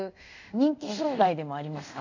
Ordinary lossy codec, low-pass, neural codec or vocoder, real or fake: AAC, 48 kbps; 7.2 kHz; codec, 24 kHz, 0.5 kbps, DualCodec; fake